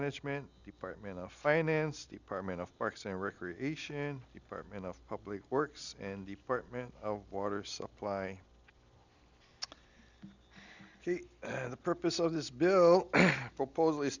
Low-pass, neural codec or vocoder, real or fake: 7.2 kHz; none; real